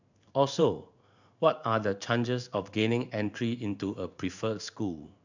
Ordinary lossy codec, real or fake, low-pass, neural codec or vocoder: none; fake; 7.2 kHz; codec, 16 kHz in and 24 kHz out, 1 kbps, XY-Tokenizer